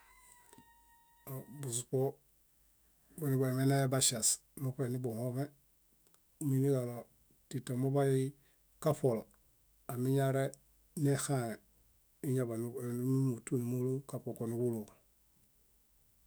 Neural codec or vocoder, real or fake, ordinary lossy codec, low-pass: autoencoder, 48 kHz, 128 numbers a frame, DAC-VAE, trained on Japanese speech; fake; none; none